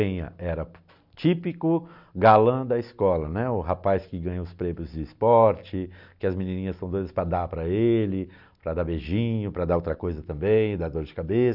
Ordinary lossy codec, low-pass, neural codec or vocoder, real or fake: none; 5.4 kHz; none; real